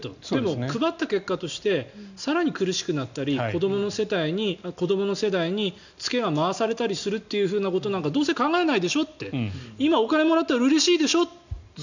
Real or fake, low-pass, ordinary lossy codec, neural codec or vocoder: real; 7.2 kHz; none; none